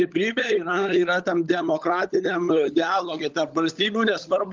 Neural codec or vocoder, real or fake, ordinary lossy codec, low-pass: codec, 16 kHz, 16 kbps, FunCodec, trained on LibriTTS, 50 frames a second; fake; Opus, 24 kbps; 7.2 kHz